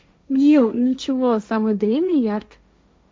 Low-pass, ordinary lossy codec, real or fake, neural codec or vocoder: none; none; fake; codec, 16 kHz, 1.1 kbps, Voila-Tokenizer